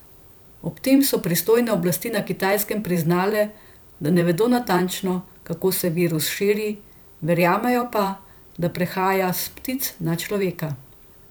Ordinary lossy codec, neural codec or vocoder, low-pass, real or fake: none; vocoder, 44.1 kHz, 128 mel bands every 512 samples, BigVGAN v2; none; fake